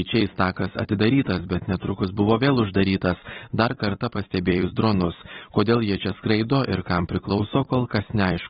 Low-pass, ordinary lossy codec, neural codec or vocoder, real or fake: 19.8 kHz; AAC, 16 kbps; vocoder, 44.1 kHz, 128 mel bands every 256 samples, BigVGAN v2; fake